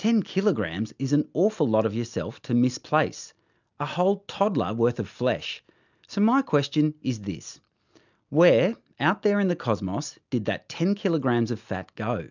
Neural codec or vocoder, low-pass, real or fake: none; 7.2 kHz; real